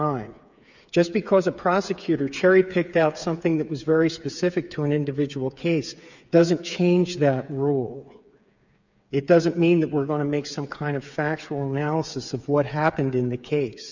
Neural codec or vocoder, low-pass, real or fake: codec, 16 kHz, 8 kbps, FreqCodec, smaller model; 7.2 kHz; fake